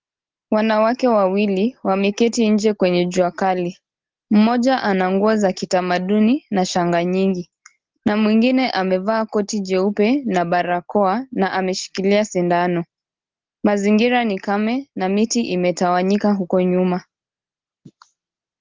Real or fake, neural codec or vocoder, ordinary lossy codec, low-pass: real; none; Opus, 16 kbps; 7.2 kHz